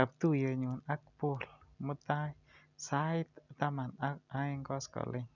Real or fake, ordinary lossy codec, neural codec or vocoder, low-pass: real; none; none; 7.2 kHz